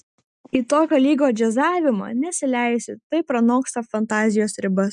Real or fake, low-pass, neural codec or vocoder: real; 10.8 kHz; none